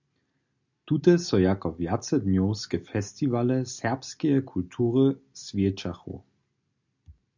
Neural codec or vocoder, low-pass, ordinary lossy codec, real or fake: none; 7.2 kHz; MP3, 64 kbps; real